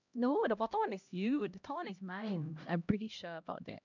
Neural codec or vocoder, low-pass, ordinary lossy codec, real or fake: codec, 16 kHz, 1 kbps, X-Codec, HuBERT features, trained on LibriSpeech; 7.2 kHz; none; fake